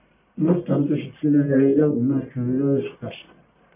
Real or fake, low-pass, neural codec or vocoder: fake; 3.6 kHz; codec, 44.1 kHz, 1.7 kbps, Pupu-Codec